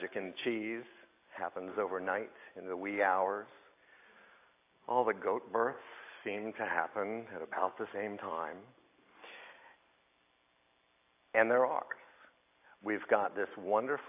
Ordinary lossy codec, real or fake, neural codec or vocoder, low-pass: AAC, 24 kbps; real; none; 3.6 kHz